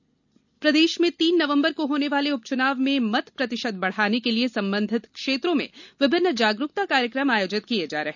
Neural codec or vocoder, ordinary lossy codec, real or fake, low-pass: none; none; real; 7.2 kHz